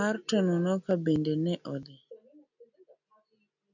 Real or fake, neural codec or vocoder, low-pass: real; none; 7.2 kHz